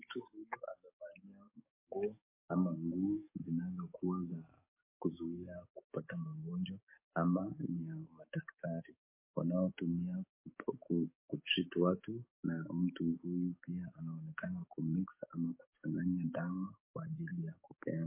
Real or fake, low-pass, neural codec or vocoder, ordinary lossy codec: fake; 3.6 kHz; codec, 44.1 kHz, 7.8 kbps, DAC; MP3, 24 kbps